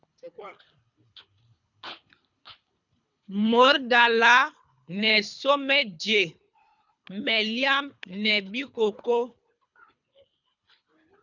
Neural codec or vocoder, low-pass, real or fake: codec, 24 kHz, 3 kbps, HILCodec; 7.2 kHz; fake